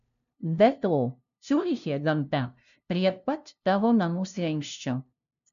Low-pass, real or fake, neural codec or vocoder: 7.2 kHz; fake; codec, 16 kHz, 0.5 kbps, FunCodec, trained on LibriTTS, 25 frames a second